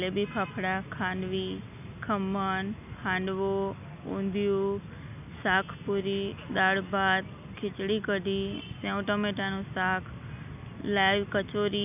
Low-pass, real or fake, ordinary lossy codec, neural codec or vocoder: 3.6 kHz; real; none; none